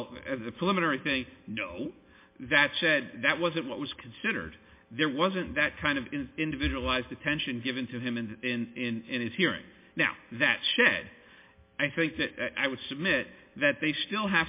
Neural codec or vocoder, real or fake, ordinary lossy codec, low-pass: none; real; MP3, 24 kbps; 3.6 kHz